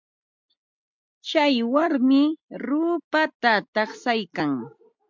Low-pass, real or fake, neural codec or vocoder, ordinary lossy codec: 7.2 kHz; real; none; MP3, 64 kbps